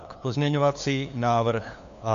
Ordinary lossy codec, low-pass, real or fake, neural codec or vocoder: MP3, 64 kbps; 7.2 kHz; fake; codec, 16 kHz, 2 kbps, FunCodec, trained on LibriTTS, 25 frames a second